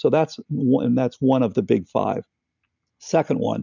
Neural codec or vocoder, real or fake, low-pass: none; real; 7.2 kHz